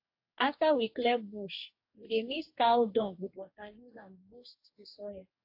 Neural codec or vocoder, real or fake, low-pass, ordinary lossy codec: codec, 44.1 kHz, 2.6 kbps, DAC; fake; 5.4 kHz; AAC, 32 kbps